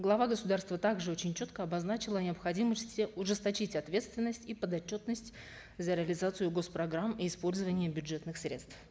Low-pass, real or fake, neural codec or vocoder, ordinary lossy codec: none; real; none; none